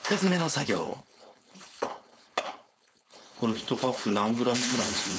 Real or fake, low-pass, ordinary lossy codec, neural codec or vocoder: fake; none; none; codec, 16 kHz, 4.8 kbps, FACodec